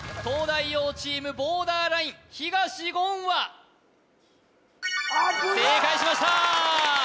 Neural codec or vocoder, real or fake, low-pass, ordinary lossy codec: none; real; none; none